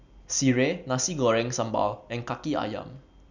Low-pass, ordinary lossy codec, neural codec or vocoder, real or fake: 7.2 kHz; none; none; real